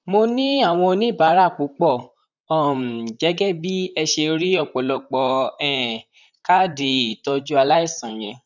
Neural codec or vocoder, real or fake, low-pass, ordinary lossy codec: vocoder, 44.1 kHz, 128 mel bands, Pupu-Vocoder; fake; 7.2 kHz; none